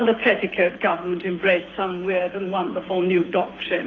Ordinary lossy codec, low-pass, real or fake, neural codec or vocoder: AAC, 32 kbps; 7.2 kHz; fake; codec, 24 kHz, 6 kbps, HILCodec